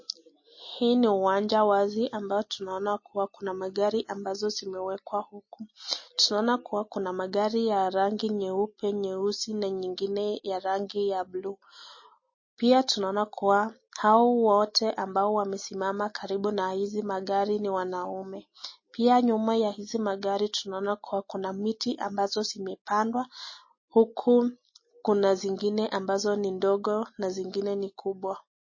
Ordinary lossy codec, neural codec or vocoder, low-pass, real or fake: MP3, 32 kbps; none; 7.2 kHz; real